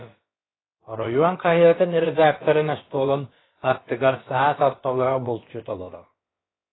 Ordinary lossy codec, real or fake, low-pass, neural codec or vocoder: AAC, 16 kbps; fake; 7.2 kHz; codec, 16 kHz, about 1 kbps, DyCAST, with the encoder's durations